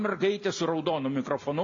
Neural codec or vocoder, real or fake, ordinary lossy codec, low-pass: none; real; MP3, 48 kbps; 7.2 kHz